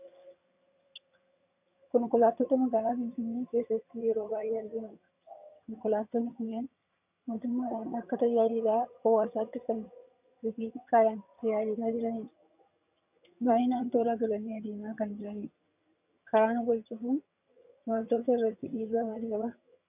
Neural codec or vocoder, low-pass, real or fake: vocoder, 22.05 kHz, 80 mel bands, HiFi-GAN; 3.6 kHz; fake